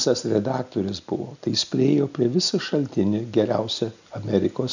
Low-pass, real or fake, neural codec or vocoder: 7.2 kHz; real; none